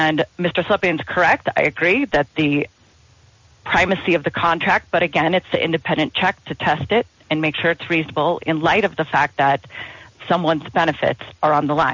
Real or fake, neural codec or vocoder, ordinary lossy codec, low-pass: real; none; MP3, 64 kbps; 7.2 kHz